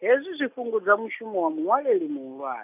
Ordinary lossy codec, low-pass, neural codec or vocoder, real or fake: none; 3.6 kHz; none; real